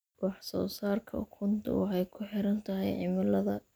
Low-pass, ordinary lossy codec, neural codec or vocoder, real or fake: none; none; none; real